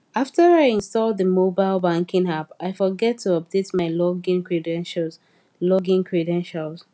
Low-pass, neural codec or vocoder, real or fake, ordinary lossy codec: none; none; real; none